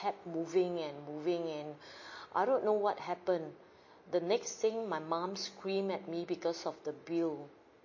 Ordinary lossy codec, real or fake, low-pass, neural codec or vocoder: MP3, 32 kbps; real; 7.2 kHz; none